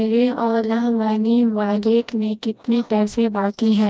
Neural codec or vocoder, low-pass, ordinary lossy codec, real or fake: codec, 16 kHz, 1 kbps, FreqCodec, smaller model; none; none; fake